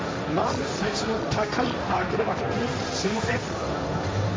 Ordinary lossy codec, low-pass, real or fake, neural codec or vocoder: none; none; fake; codec, 16 kHz, 1.1 kbps, Voila-Tokenizer